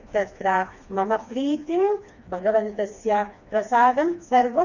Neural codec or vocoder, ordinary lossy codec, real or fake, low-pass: codec, 16 kHz, 2 kbps, FreqCodec, smaller model; none; fake; 7.2 kHz